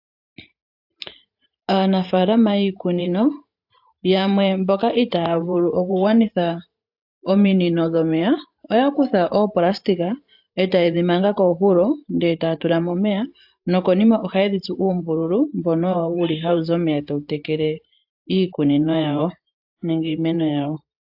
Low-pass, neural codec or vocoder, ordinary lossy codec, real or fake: 5.4 kHz; vocoder, 44.1 kHz, 128 mel bands every 512 samples, BigVGAN v2; AAC, 48 kbps; fake